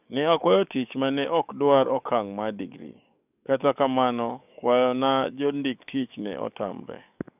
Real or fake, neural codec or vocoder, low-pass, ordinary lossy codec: fake; codec, 44.1 kHz, 7.8 kbps, DAC; 3.6 kHz; none